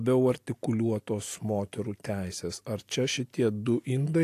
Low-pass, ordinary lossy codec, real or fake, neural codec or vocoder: 14.4 kHz; AAC, 64 kbps; real; none